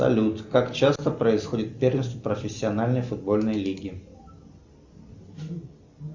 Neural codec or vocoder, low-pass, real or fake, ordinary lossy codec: none; 7.2 kHz; real; Opus, 64 kbps